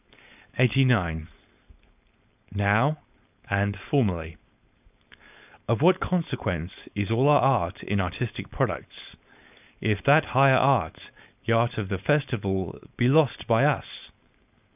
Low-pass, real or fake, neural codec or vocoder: 3.6 kHz; fake; codec, 16 kHz, 4.8 kbps, FACodec